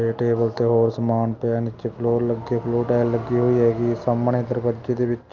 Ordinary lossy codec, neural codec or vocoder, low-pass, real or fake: Opus, 24 kbps; none; 7.2 kHz; real